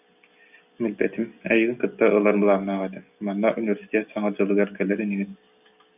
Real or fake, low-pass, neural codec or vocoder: real; 3.6 kHz; none